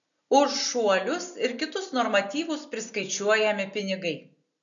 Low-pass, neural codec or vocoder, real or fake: 7.2 kHz; none; real